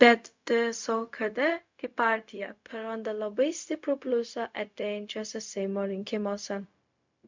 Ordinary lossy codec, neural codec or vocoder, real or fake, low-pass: MP3, 64 kbps; codec, 16 kHz, 0.4 kbps, LongCat-Audio-Codec; fake; 7.2 kHz